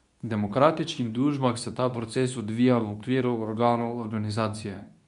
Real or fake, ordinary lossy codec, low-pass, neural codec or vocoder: fake; none; 10.8 kHz; codec, 24 kHz, 0.9 kbps, WavTokenizer, medium speech release version 2